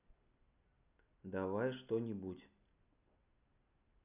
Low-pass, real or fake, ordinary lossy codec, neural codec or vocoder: 3.6 kHz; real; none; none